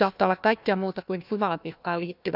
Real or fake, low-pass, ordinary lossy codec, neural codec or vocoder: fake; 5.4 kHz; none; codec, 16 kHz, 1 kbps, FunCodec, trained on Chinese and English, 50 frames a second